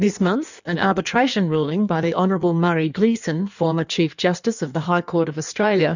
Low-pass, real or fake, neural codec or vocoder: 7.2 kHz; fake; codec, 16 kHz in and 24 kHz out, 1.1 kbps, FireRedTTS-2 codec